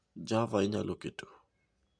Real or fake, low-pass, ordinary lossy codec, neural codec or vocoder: real; 9.9 kHz; none; none